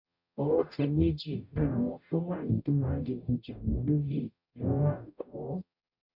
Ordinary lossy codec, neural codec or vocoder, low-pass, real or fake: none; codec, 44.1 kHz, 0.9 kbps, DAC; 5.4 kHz; fake